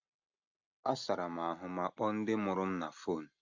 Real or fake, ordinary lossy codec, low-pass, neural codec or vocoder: real; AAC, 48 kbps; 7.2 kHz; none